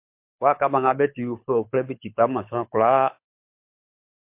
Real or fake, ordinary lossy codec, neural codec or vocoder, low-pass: fake; MP3, 32 kbps; codec, 24 kHz, 6 kbps, HILCodec; 3.6 kHz